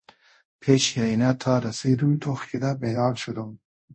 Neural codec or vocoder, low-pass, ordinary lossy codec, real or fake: codec, 24 kHz, 0.5 kbps, DualCodec; 9.9 kHz; MP3, 32 kbps; fake